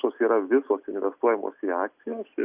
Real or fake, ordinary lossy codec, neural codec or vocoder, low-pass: real; Opus, 64 kbps; none; 3.6 kHz